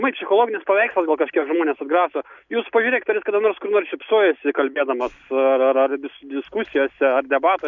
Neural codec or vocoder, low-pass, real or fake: none; 7.2 kHz; real